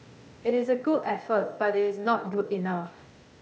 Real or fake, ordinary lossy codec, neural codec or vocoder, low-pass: fake; none; codec, 16 kHz, 0.8 kbps, ZipCodec; none